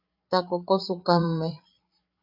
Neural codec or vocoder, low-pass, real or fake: codec, 16 kHz, 8 kbps, FreqCodec, larger model; 5.4 kHz; fake